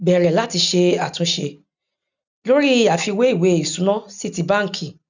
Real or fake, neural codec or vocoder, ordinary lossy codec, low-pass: real; none; none; 7.2 kHz